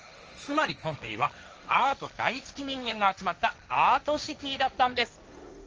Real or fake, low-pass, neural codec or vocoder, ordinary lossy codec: fake; 7.2 kHz; codec, 16 kHz, 1.1 kbps, Voila-Tokenizer; Opus, 24 kbps